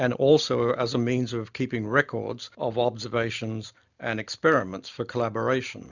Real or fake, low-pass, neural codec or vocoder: real; 7.2 kHz; none